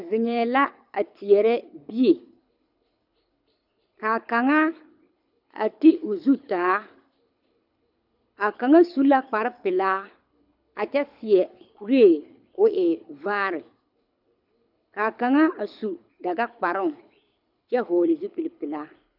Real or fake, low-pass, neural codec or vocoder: fake; 5.4 kHz; codec, 24 kHz, 6 kbps, HILCodec